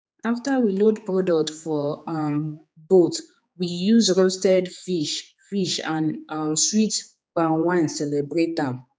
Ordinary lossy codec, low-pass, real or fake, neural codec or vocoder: none; none; fake; codec, 16 kHz, 4 kbps, X-Codec, HuBERT features, trained on general audio